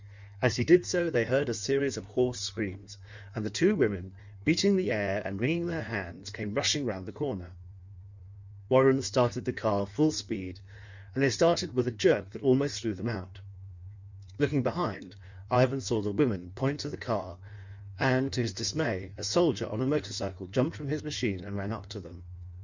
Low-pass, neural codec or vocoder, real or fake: 7.2 kHz; codec, 16 kHz in and 24 kHz out, 1.1 kbps, FireRedTTS-2 codec; fake